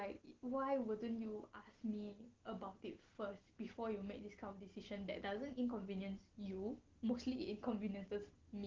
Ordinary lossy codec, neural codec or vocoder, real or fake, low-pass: Opus, 16 kbps; none; real; 7.2 kHz